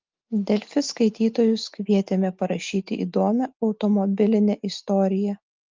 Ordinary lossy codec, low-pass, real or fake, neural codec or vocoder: Opus, 24 kbps; 7.2 kHz; real; none